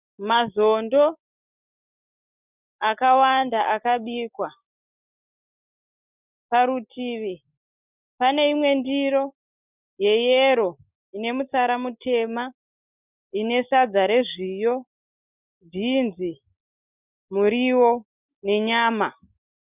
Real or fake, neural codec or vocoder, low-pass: real; none; 3.6 kHz